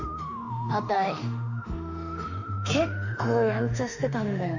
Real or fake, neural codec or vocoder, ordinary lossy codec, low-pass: fake; autoencoder, 48 kHz, 32 numbers a frame, DAC-VAE, trained on Japanese speech; none; 7.2 kHz